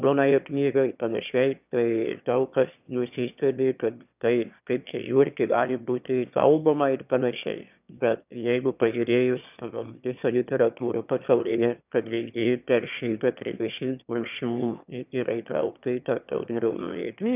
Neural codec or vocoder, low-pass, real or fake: autoencoder, 22.05 kHz, a latent of 192 numbers a frame, VITS, trained on one speaker; 3.6 kHz; fake